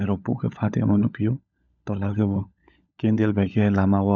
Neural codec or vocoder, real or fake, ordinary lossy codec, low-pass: codec, 16 kHz, 16 kbps, FunCodec, trained on LibriTTS, 50 frames a second; fake; none; 7.2 kHz